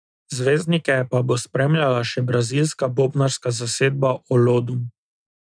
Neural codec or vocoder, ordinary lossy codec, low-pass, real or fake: none; none; 9.9 kHz; real